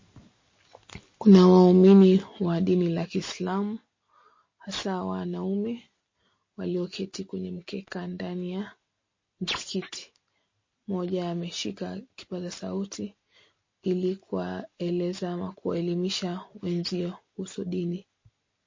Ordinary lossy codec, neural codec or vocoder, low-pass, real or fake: MP3, 32 kbps; none; 7.2 kHz; real